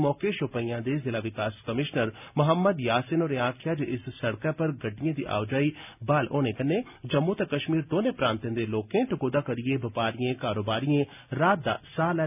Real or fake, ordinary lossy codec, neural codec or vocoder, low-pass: real; none; none; 3.6 kHz